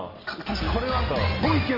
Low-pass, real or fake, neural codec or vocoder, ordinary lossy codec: 5.4 kHz; real; none; Opus, 24 kbps